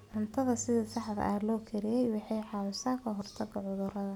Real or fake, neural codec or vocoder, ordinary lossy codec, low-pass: real; none; none; 19.8 kHz